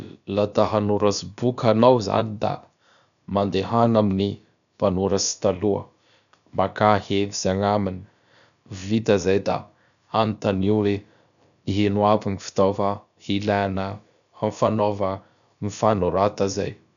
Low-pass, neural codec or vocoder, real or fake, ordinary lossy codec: 7.2 kHz; codec, 16 kHz, about 1 kbps, DyCAST, with the encoder's durations; fake; none